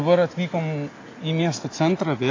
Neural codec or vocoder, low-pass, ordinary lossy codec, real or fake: none; 7.2 kHz; AAC, 32 kbps; real